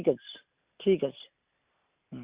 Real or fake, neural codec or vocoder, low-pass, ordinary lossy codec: real; none; 3.6 kHz; Opus, 64 kbps